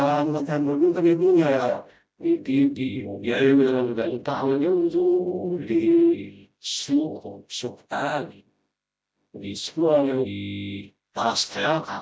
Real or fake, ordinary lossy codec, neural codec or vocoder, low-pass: fake; none; codec, 16 kHz, 0.5 kbps, FreqCodec, smaller model; none